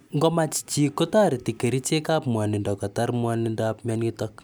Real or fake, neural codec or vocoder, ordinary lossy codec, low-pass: real; none; none; none